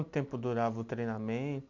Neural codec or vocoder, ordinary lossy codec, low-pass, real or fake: none; none; 7.2 kHz; real